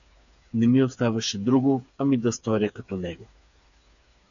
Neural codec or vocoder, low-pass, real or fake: codec, 16 kHz, 4 kbps, FreqCodec, smaller model; 7.2 kHz; fake